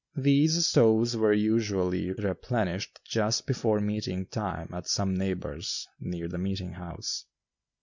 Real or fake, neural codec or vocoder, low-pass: real; none; 7.2 kHz